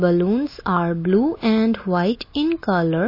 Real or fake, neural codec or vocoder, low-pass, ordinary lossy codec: real; none; 5.4 kHz; MP3, 24 kbps